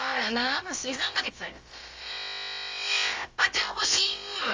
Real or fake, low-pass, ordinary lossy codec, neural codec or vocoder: fake; 7.2 kHz; Opus, 32 kbps; codec, 16 kHz, about 1 kbps, DyCAST, with the encoder's durations